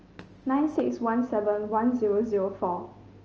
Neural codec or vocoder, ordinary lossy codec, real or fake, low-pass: none; Opus, 24 kbps; real; 7.2 kHz